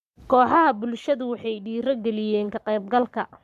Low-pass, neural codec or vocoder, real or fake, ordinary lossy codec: 14.4 kHz; codec, 44.1 kHz, 7.8 kbps, Pupu-Codec; fake; AAC, 96 kbps